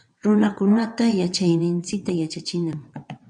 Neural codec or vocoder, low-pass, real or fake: vocoder, 22.05 kHz, 80 mel bands, WaveNeXt; 9.9 kHz; fake